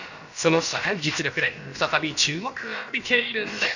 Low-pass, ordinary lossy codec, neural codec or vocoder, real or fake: 7.2 kHz; none; codec, 16 kHz, about 1 kbps, DyCAST, with the encoder's durations; fake